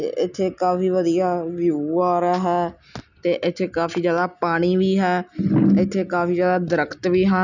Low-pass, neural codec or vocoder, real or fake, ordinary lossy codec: 7.2 kHz; none; real; none